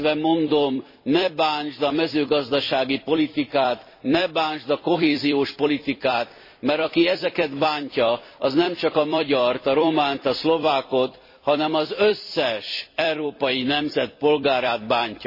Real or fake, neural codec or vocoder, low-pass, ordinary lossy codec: fake; vocoder, 44.1 kHz, 128 mel bands every 256 samples, BigVGAN v2; 5.4 kHz; MP3, 24 kbps